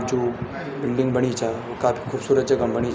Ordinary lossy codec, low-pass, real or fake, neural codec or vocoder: none; none; real; none